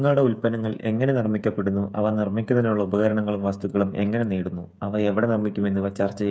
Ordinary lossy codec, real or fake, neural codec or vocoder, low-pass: none; fake; codec, 16 kHz, 8 kbps, FreqCodec, smaller model; none